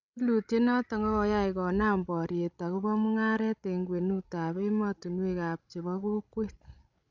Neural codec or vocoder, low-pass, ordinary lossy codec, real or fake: codec, 16 kHz, 16 kbps, FreqCodec, larger model; 7.2 kHz; none; fake